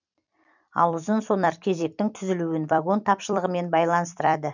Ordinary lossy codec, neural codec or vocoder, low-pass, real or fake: none; vocoder, 44.1 kHz, 128 mel bands every 256 samples, BigVGAN v2; 7.2 kHz; fake